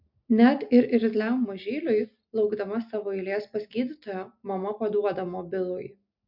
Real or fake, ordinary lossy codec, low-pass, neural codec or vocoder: real; MP3, 48 kbps; 5.4 kHz; none